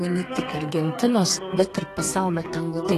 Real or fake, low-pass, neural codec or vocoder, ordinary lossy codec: fake; 14.4 kHz; codec, 32 kHz, 1.9 kbps, SNAC; AAC, 48 kbps